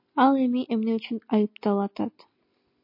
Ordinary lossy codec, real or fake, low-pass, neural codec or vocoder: MP3, 32 kbps; real; 5.4 kHz; none